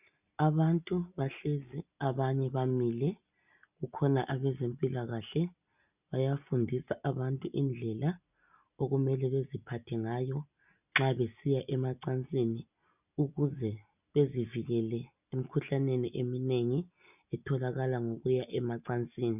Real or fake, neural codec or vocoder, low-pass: real; none; 3.6 kHz